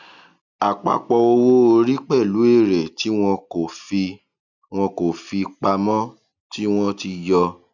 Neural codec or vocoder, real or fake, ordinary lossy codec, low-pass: none; real; none; 7.2 kHz